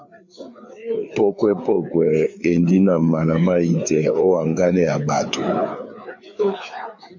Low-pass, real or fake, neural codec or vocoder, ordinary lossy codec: 7.2 kHz; fake; codec, 16 kHz, 4 kbps, FreqCodec, larger model; MP3, 48 kbps